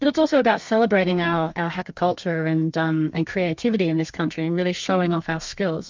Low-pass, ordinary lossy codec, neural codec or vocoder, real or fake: 7.2 kHz; MP3, 64 kbps; codec, 32 kHz, 1.9 kbps, SNAC; fake